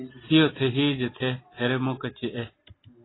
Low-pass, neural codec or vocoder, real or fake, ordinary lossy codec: 7.2 kHz; none; real; AAC, 16 kbps